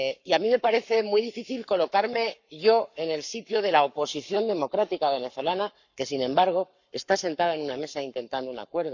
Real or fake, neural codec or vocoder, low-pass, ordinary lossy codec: fake; codec, 44.1 kHz, 7.8 kbps, Pupu-Codec; 7.2 kHz; none